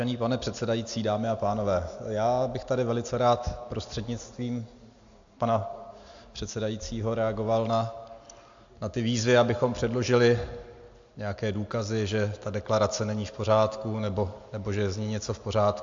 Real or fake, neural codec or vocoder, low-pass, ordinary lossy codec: real; none; 7.2 kHz; AAC, 64 kbps